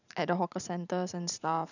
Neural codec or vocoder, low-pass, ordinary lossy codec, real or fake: vocoder, 44.1 kHz, 128 mel bands every 512 samples, BigVGAN v2; 7.2 kHz; none; fake